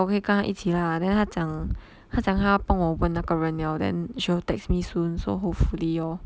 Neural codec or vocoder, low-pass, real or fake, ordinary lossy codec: none; none; real; none